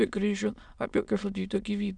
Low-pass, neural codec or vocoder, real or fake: 9.9 kHz; autoencoder, 22.05 kHz, a latent of 192 numbers a frame, VITS, trained on many speakers; fake